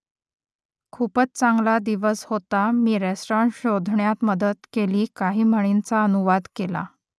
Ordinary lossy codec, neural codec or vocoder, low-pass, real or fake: none; none; none; real